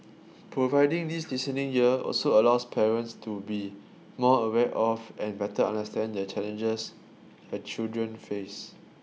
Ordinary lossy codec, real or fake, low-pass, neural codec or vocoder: none; real; none; none